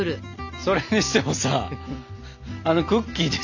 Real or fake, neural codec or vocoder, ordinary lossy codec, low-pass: real; none; none; 7.2 kHz